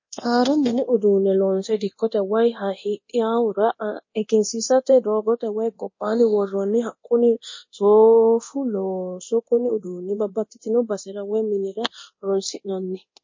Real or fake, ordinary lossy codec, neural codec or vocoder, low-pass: fake; MP3, 32 kbps; codec, 24 kHz, 0.9 kbps, DualCodec; 7.2 kHz